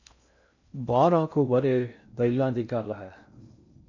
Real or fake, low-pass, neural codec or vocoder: fake; 7.2 kHz; codec, 16 kHz in and 24 kHz out, 0.6 kbps, FocalCodec, streaming, 4096 codes